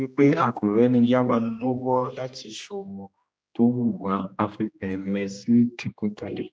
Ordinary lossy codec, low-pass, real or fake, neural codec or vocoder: none; none; fake; codec, 16 kHz, 1 kbps, X-Codec, HuBERT features, trained on general audio